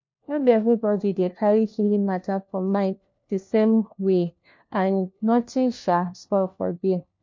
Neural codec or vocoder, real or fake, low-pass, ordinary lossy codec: codec, 16 kHz, 1 kbps, FunCodec, trained on LibriTTS, 50 frames a second; fake; 7.2 kHz; MP3, 48 kbps